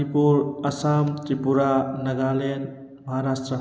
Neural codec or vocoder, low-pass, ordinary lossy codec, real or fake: none; none; none; real